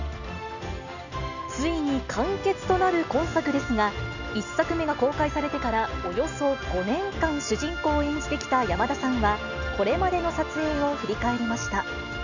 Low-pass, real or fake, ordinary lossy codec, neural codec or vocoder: 7.2 kHz; real; none; none